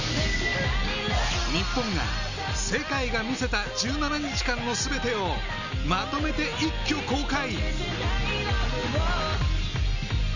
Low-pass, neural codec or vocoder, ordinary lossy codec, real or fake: 7.2 kHz; none; none; real